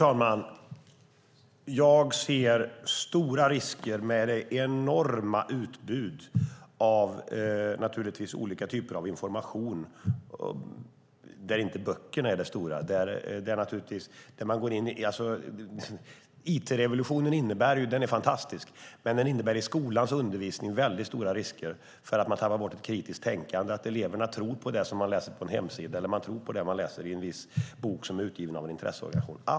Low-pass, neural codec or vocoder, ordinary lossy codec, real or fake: none; none; none; real